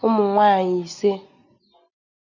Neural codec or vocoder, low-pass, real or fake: none; 7.2 kHz; real